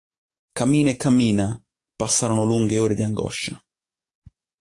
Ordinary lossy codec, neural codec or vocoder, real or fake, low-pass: AAC, 48 kbps; codec, 44.1 kHz, 7.8 kbps, DAC; fake; 10.8 kHz